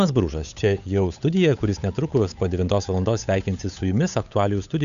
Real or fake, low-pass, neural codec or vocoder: fake; 7.2 kHz; codec, 16 kHz, 8 kbps, FunCodec, trained on Chinese and English, 25 frames a second